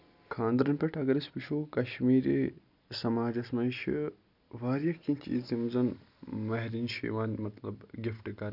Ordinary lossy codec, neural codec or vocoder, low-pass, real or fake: none; none; 5.4 kHz; real